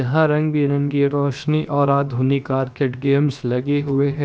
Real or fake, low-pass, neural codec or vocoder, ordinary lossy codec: fake; none; codec, 16 kHz, 0.7 kbps, FocalCodec; none